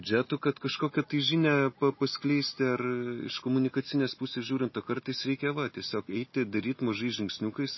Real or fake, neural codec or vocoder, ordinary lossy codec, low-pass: real; none; MP3, 24 kbps; 7.2 kHz